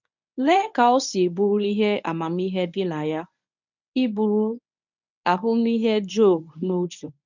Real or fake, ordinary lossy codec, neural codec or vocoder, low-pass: fake; none; codec, 24 kHz, 0.9 kbps, WavTokenizer, medium speech release version 1; 7.2 kHz